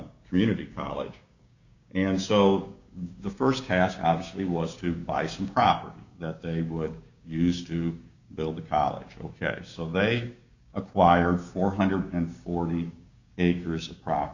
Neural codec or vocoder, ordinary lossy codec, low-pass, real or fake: codec, 16 kHz, 6 kbps, DAC; Opus, 64 kbps; 7.2 kHz; fake